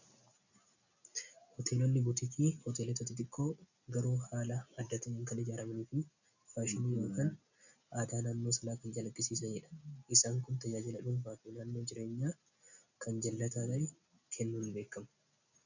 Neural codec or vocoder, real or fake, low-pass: none; real; 7.2 kHz